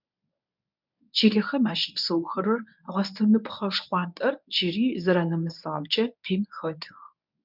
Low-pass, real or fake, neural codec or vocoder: 5.4 kHz; fake; codec, 24 kHz, 0.9 kbps, WavTokenizer, medium speech release version 1